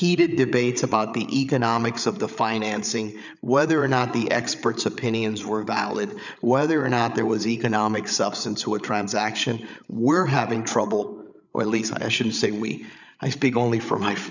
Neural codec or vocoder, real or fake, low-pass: codec, 16 kHz, 8 kbps, FreqCodec, larger model; fake; 7.2 kHz